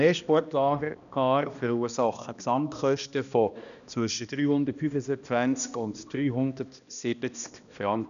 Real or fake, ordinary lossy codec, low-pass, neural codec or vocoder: fake; none; 7.2 kHz; codec, 16 kHz, 1 kbps, X-Codec, HuBERT features, trained on balanced general audio